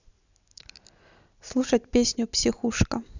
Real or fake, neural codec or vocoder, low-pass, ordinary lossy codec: real; none; 7.2 kHz; none